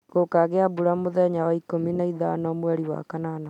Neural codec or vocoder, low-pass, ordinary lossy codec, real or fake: none; 19.8 kHz; none; real